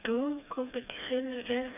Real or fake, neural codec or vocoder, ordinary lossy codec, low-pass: fake; codec, 16 kHz, 2 kbps, FreqCodec, smaller model; none; 3.6 kHz